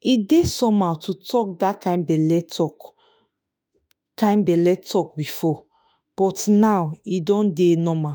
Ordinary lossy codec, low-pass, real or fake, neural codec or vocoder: none; none; fake; autoencoder, 48 kHz, 32 numbers a frame, DAC-VAE, trained on Japanese speech